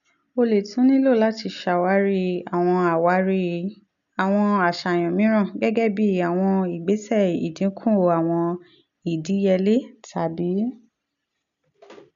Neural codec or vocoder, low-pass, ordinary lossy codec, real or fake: none; 7.2 kHz; none; real